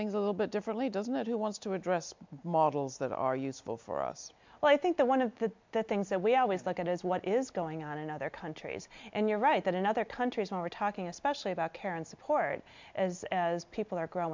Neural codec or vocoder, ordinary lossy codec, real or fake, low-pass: none; MP3, 64 kbps; real; 7.2 kHz